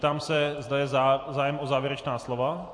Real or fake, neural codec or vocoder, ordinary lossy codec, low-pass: fake; vocoder, 44.1 kHz, 128 mel bands every 256 samples, BigVGAN v2; MP3, 48 kbps; 9.9 kHz